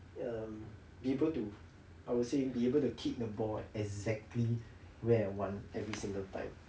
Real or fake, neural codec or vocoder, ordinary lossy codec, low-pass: real; none; none; none